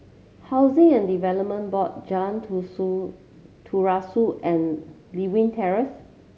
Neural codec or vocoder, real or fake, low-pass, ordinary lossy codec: none; real; none; none